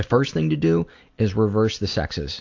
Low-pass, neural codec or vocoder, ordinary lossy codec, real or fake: 7.2 kHz; none; AAC, 48 kbps; real